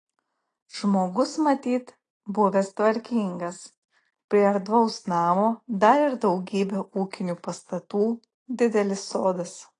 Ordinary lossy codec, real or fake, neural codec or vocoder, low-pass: AAC, 32 kbps; real; none; 9.9 kHz